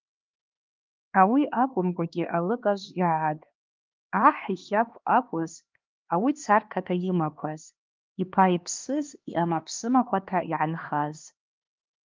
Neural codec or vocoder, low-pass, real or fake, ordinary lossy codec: codec, 16 kHz, 2 kbps, X-Codec, HuBERT features, trained on LibriSpeech; 7.2 kHz; fake; Opus, 32 kbps